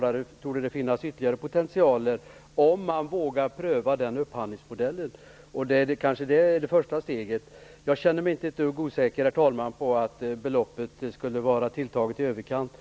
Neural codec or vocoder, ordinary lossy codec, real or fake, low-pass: none; none; real; none